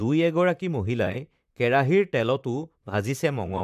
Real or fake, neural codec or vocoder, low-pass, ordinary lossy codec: fake; vocoder, 44.1 kHz, 128 mel bands, Pupu-Vocoder; 14.4 kHz; none